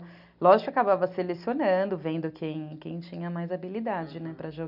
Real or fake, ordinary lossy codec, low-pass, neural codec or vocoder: real; none; 5.4 kHz; none